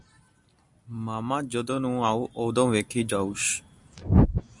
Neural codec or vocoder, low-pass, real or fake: none; 10.8 kHz; real